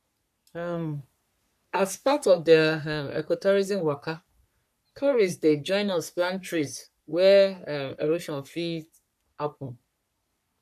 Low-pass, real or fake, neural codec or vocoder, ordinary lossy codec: 14.4 kHz; fake; codec, 44.1 kHz, 3.4 kbps, Pupu-Codec; none